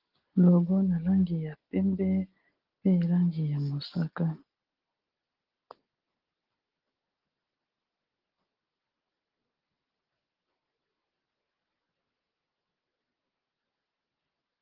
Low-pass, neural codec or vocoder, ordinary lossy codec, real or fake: 5.4 kHz; none; Opus, 16 kbps; real